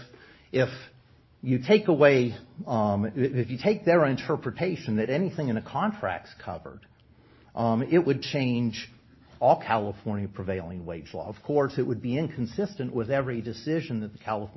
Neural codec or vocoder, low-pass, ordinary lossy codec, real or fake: none; 7.2 kHz; MP3, 24 kbps; real